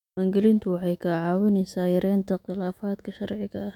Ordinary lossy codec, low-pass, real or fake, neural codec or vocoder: none; 19.8 kHz; fake; autoencoder, 48 kHz, 128 numbers a frame, DAC-VAE, trained on Japanese speech